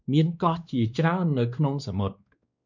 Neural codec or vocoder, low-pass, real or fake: codec, 16 kHz, 2 kbps, X-Codec, WavLM features, trained on Multilingual LibriSpeech; 7.2 kHz; fake